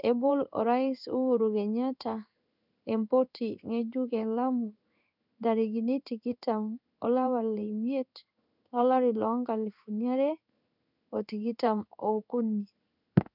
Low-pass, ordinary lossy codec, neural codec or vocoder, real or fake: 5.4 kHz; none; codec, 16 kHz in and 24 kHz out, 1 kbps, XY-Tokenizer; fake